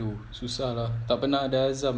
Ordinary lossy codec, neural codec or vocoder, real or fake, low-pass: none; none; real; none